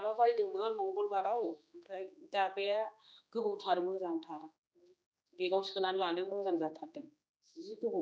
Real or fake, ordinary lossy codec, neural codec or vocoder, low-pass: fake; none; codec, 16 kHz, 2 kbps, X-Codec, HuBERT features, trained on general audio; none